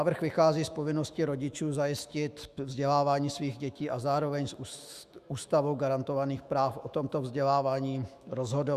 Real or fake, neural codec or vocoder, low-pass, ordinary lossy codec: fake; autoencoder, 48 kHz, 128 numbers a frame, DAC-VAE, trained on Japanese speech; 14.4 kHz; Opus, 64 kbps